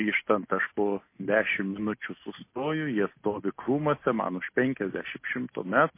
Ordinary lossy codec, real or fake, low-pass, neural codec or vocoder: MP3, 24 kbps; real; 3.6 kHz; none